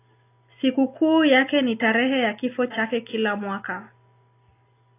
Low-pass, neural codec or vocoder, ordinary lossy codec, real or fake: 3.6 kHz; none; AAC, 24 kbps; real